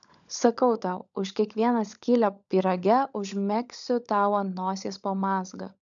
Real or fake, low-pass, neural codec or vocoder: fake; 7.2 kHz; codec, 16 kHz, 16 kbps, FunCodec, trained on LibriTTS, 50 frames a second